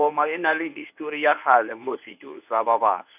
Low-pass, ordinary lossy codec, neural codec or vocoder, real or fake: 3.6 kHz; none; codec, 24 kHz, 0.9 kbps, WavTokenizer, medium speech release version 1; fake